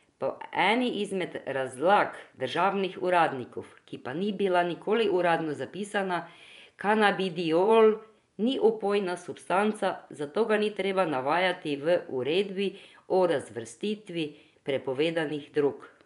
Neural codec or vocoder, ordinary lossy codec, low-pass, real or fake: vocoder, 24 kHz, 100 mel bands, Vocos; none; 10.8 kHz; fake